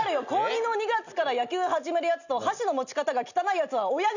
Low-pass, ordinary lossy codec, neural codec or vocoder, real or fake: 7.2 kHz; none; none; real